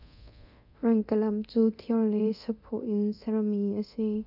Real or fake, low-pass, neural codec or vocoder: fake; 5.4 kHz; codec, 24 kHz, 0.9 kbps, DualCodec